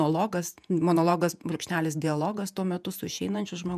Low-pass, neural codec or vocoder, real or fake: 14.4 kHz; vocoder, 48 kHz, 128 mel bands, Vocos; fake